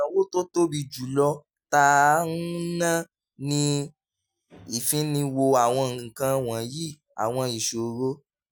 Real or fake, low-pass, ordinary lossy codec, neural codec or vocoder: real; none; none; none